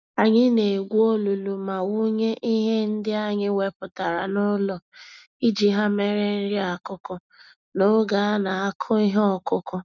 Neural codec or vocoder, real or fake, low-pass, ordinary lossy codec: none; real; 7.2 kHz; none